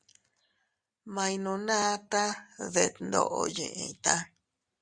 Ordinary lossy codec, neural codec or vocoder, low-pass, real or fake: Opus, 64 kbps; none; 9.9 kHz; real